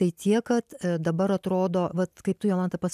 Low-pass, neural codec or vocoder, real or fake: 14.4 kHz; none; real